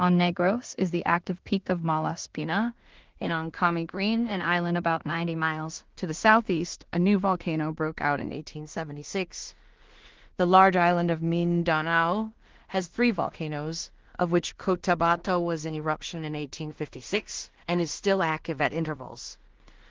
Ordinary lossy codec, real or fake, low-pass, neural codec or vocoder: Opus, 16 kbps; fake; 7.2 kHz; codec, 16 kHz in and 24 kHz out, 0.4 kbps, LongCat-Audio-Codec, two codebook decoder